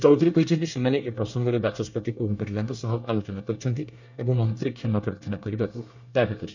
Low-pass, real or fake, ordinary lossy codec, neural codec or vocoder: 7.2 kHz; fake; none; codec, 24 kHz, 1 kbps, SNAC